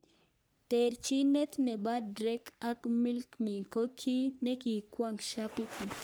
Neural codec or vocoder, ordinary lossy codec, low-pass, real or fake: codec, 44.1 kHz, 3.4 kbps, Pupu-Codec; none; none; fake